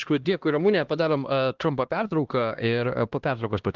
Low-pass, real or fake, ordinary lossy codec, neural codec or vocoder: 7.2 kHz; fake; Opus, 16 kbps; codec, 16 kHz, 1 kbps, X-Codec, HuBERT features, trained on LibriSpeech